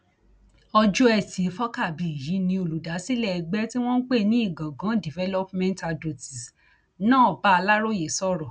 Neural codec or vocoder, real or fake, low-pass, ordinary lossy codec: none; real; none; none